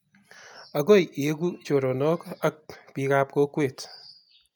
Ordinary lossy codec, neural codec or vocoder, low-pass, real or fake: none; none; none; real